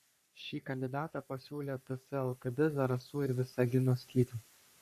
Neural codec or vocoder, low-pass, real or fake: codec, 44.1 kHz, 3.4 kbps, Pupu-Codec; 14.4 kHz; fake